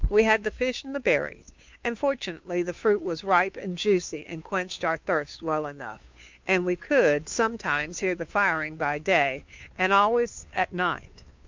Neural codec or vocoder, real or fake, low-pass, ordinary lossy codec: codec, 16 kHz, 2 kbps, FunCodec, trained on Chinese and English, 25 frames a second; fake; 7.2 kHz; MP3, 64 kbps